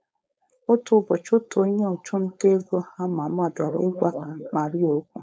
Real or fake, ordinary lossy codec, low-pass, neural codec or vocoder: fake; none; none; codec, 16 kHz, 4.8 kbps, FACodec